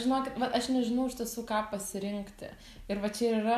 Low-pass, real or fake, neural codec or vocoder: 14.4 kHz; real; none